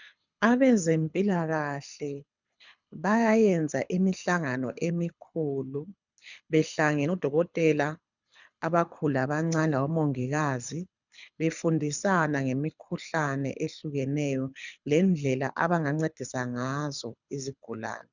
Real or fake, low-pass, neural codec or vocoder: fake; 7.2 kHz; codec, 24 kHz, 6 kbps, HILCodec